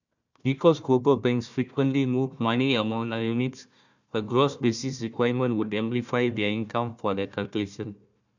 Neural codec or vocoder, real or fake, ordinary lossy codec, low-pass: codec, 16 kHz, 1 kbps, FunCodec, trained on Chinese and English, 50 frames a second; fake; none; 7.2 kHz